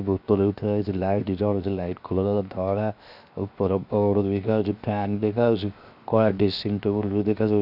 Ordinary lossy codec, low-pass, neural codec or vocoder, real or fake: none; 5.4 kHz; codec, 16 kHz, 0.7 kbps, FocalCodec; fake